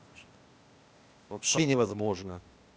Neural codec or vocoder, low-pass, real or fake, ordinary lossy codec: codec, 16 kHz, 0.8 kbps, ZipCodec; none; fake; none